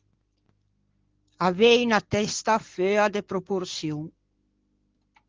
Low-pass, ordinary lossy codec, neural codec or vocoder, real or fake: 7.2 kHz; Opus, 16 kbps; none; real